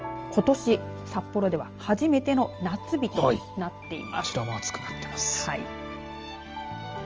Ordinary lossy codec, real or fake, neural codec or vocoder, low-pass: Opus, 24 kbps; real; none; 7.2 kHz